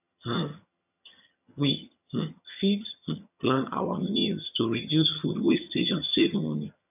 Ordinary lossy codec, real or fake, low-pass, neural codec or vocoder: none; fake; 3.6 kHz; vocoder, 22.05 kHz, 80 mel bands, HiFi-GAN